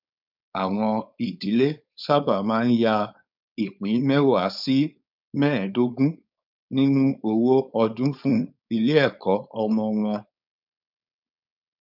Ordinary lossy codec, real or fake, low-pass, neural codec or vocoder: none; fake; 5.4 kHz; codec, 16 kHz, 4.8 kbps, FACodec